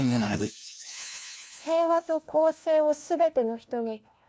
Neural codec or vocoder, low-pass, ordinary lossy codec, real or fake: codec, 16 kHz, 1 kbps, FunCodec, trained on LibriTTS, 50 frames a second; none; none; fake